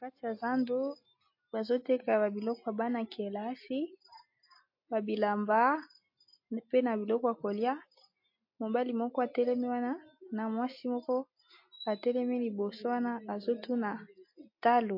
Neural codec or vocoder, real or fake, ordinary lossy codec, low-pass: none; real; MP3, 32 kbps; 5.4 kHz